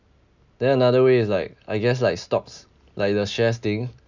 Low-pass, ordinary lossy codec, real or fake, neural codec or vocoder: 7.2 kHz; none; real; none